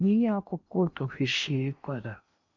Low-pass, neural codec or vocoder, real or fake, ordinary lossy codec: 7.2 kHz; codec, 16 kHz in and 24 kHz out, 0.8 kbps, FocalCodec, streaming, 65536 codes; fake; MP3, 64 kbps